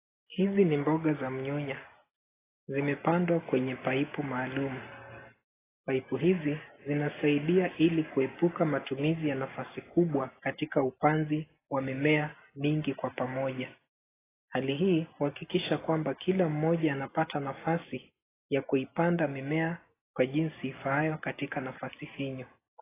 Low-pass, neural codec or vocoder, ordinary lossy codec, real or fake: 3.6 kHz; none; AAC, 16 kbps; real